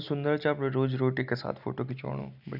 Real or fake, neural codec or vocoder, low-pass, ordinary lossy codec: real; none; 5.4 kHz; none